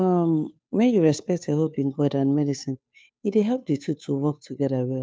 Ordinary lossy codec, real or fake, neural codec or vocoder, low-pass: none; fake; codec, 16 kHz, 2 kbps, FunCodec, trained on Chinese and English, 25 frames a second; none